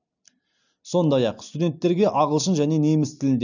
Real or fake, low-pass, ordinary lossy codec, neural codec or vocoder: real; 7.2 kHz; none; none